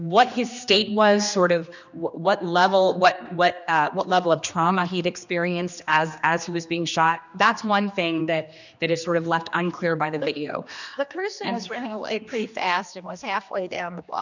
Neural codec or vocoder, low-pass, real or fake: codec, 16 kHz, 2 kbps, X-Codec, HuBERT features, trained on general audio; 7.2 kHz; fake